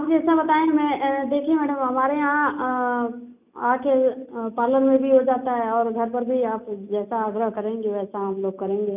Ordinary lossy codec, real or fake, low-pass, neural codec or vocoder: none; real; 3.6 kHz; none